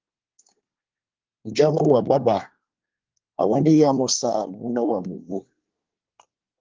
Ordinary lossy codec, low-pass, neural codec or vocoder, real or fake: Opus, 24 kbps; 7.2 kHz; codec, 24 kHz, 1 kbps, SNAC; fake